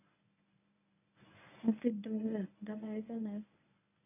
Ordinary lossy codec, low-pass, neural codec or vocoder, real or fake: none; 3.6 kHz; codec, 24 kHz, 0.9 kbps, WavTokenizer, medium speech release version 1; fake